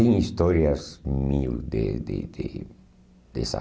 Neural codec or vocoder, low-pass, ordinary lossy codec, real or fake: none; none; none; real